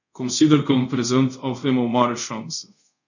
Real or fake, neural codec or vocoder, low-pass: fake; codec, 24 kHz, 0.5 kbps, DualCodec; 7.2 kHz